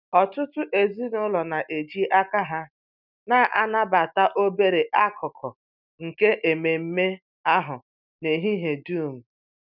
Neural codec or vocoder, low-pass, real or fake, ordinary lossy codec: none; 5.4 kHz; real; none